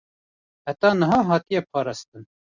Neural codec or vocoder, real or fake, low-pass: none; real; 7.2 kHz